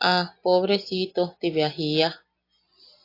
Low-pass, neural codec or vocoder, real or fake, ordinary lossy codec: 5.4 kHz; none; real; AAC, 32 kbps